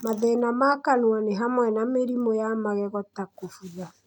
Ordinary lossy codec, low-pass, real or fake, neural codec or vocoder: none; 19.8 kHz; real; none